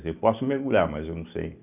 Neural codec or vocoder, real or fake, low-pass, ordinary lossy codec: none; real; 3.6 kHz; none